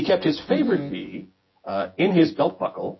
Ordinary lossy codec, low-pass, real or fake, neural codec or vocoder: MP3, 24 kbps; 7.2 kHz; fake; vocoder, 24 kHz, 100 mel bands, Vocos